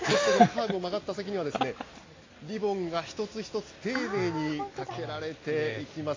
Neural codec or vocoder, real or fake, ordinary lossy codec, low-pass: none; real; AAC, 32 kbps; 7.2 kHz